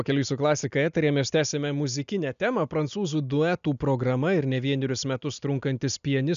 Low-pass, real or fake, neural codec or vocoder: 7.2 kHz; real; none